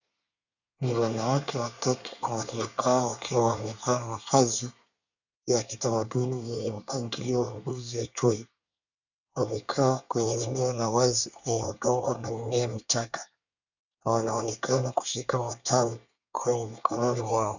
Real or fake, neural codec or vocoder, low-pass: fake; codec, 24 kHz, 1 kbps, SNAC; 7.2 kHz